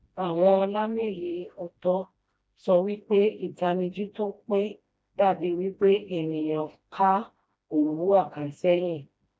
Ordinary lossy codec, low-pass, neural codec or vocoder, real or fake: none; none; codec, 16 kHz, 1 kbps, FreqCodec, smaller model; fake